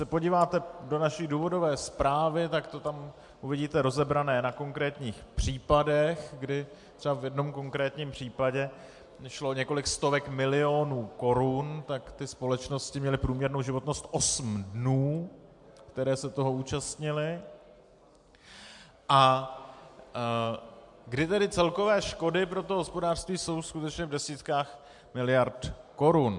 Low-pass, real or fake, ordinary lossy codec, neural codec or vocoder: 10.8 kHz; real; MP3, 64 kbps; none